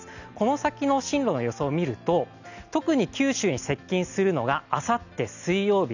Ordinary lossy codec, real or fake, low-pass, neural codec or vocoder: none; real; 7.2 kHz; none